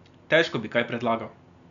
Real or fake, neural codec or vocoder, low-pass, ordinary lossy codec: real; none; 7.2 kHz; none